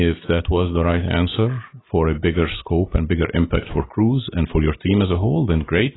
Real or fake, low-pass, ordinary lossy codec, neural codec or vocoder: real; 7.2 kHz; AAC, 16 kbps; none